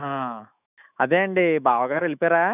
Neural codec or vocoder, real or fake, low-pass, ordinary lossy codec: autoencoder, 48 kHz, 128 numbers a frame, DAC-VAE, trained on Japanese speech; fake; 3.6 kHz; none